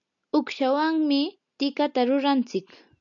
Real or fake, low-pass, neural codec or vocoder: real; 7.2 kHz; none